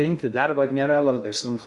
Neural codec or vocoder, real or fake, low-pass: codec, 16 kHz in and 24 kHz out, 0.6 kbps, FocalCodec, streaming, 2048 codes; fake; 10.8 kHz